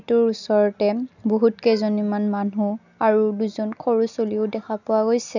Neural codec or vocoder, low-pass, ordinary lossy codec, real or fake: none; 7.2 kHz; none; real